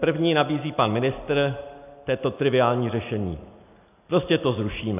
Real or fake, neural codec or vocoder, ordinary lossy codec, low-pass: real; none; AAC, 32 kbps; 3.6 kHz